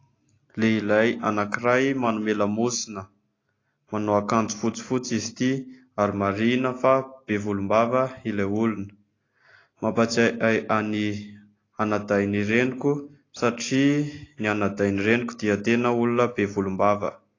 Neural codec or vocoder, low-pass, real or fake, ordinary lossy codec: none; 7.2 kHz; real; AAC, 32 kbps